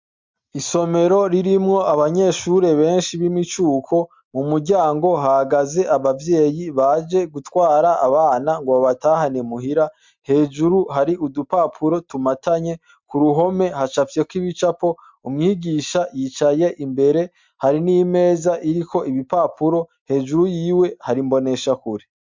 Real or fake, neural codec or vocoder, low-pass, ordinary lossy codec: real; none; 7.2 kHz; MP3, 64 kbps